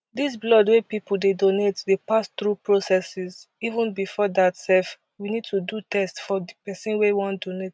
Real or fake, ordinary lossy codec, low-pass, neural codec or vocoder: real; none; none; none